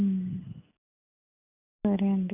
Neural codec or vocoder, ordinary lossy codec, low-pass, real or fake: none; none; 3.6 kHz; real